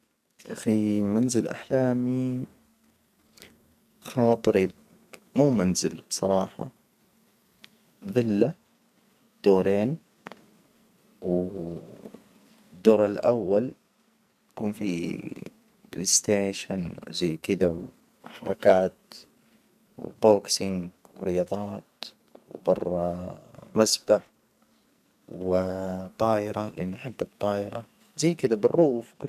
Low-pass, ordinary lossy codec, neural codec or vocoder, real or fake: 14.4 kHz; none; codec, 44.1 kHz, 2.6 kbps, SNAC; fake